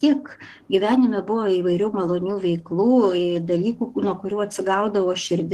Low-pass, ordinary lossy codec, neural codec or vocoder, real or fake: 14.4 kHz; Opus, 16 kbps; codec, 44.1 kHz, 7.8 kbps, DAC; fake